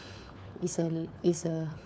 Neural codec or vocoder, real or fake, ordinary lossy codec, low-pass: codec, 16 kHz, 4 kbps, FunCodec, trained on LibriTTS, 50 frames a second; fake; none; none